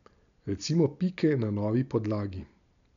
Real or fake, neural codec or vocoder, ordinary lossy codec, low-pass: real; none; none; 7.2 kHz